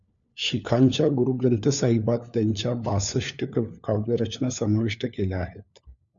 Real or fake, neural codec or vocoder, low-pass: fake; codec, 16 kHz, 4 kbps, FunCodec, trained on LibriTTS, 50 frames a second; 7.2 kHz